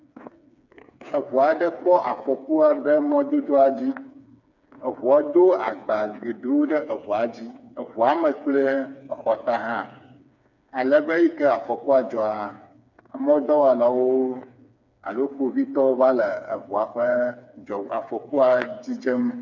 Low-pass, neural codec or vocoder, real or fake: 7.2 kHz; codec, 16 kHz, 4 kbps, FreqCodec, smaller model; fake